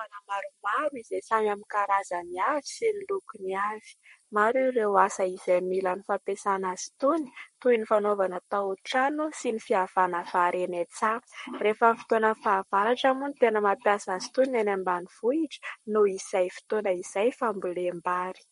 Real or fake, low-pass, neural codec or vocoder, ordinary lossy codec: fake; 19.8 kHz; codec, 44.1 kHz, 7.8 kbps, Pupu-Codec; MP3, 48 kbps